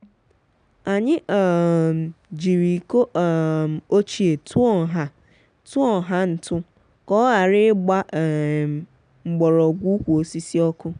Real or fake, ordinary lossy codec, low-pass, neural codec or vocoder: real; none; 9.9 kHz; none